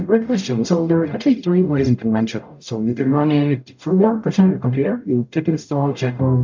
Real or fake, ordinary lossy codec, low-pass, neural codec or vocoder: fake; MP3, 64 kbps; 7.2 kHz; codec, 44.1 kHz, 0.9 kbps, DAC